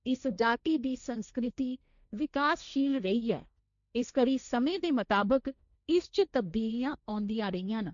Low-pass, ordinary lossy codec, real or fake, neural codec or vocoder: 7.2 kHz; none; fake; codec, 16 kHz, 1.1 kbps, Voila-Tokenizer